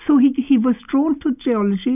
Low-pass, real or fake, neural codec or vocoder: 3.6 kHz; real; none